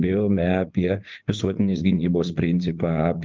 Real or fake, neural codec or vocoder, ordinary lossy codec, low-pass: fake; vocoder, 44.1 kHz, 80 mel bands, Vocos; Opus, 32 kbps; 7.2 kHz